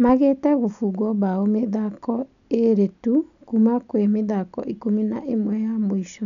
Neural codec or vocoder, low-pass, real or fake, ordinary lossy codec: none; 7.2 kHz; real; none